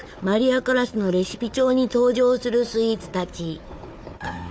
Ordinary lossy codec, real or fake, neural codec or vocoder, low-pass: none; fake; codec, 16 kHz, 4 kbps, FunCodec, trained on Chinese and English, 50 frames a second; none